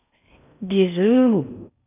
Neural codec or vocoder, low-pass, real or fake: codec, 16 kHz in and 24 kHz out, 0.6 kbps, FocalCodec, streaming, 4096 codes; 3.6 kHz; fake